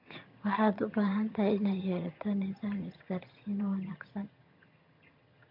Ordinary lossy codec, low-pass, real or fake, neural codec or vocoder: MP3, 48 kbps; 5.4 kHz; fake; vocoder, 22.05 kHz, 80 mel bands, HiFi-GAN